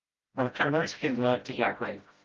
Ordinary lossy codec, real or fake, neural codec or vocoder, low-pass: Opus, 32 kbps; fake; codec, 16 kHz, 0.5 kbps, FreqCodec, smaller model; 7.2 kHz